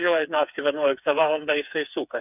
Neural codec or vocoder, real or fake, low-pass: codec, 16 kHz, 4 kbps, FreqCodec, smaller model; fake; 3.6 kHz